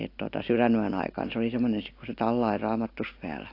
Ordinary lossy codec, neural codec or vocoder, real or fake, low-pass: AAC, 32 kbps; none; real; 5.4 kHz